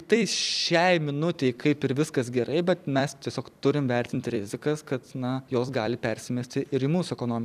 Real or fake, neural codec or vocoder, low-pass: fake; vocoder, 44.1 kHz, 128 mel bands every 256 samples, BigVGAN v2; 14.4 kHz